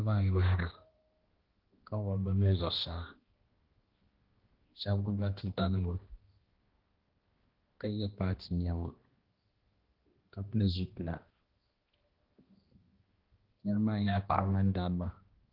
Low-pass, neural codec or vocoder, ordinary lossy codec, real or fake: 5.4 kHz; codec, 16 kHz, 1 kbps, X-Codec, HuBERT features, trained on balanced general audio; Opus, 16 kbps; fake